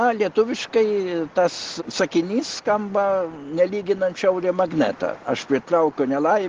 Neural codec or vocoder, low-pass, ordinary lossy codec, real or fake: none; 7.2 kHz; Opus, 16 kbps; real